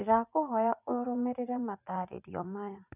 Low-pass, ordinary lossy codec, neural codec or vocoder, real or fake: 3.6 kHz; none; vocoder, 44.1 kHz, 80 mel bands, Vocos; fake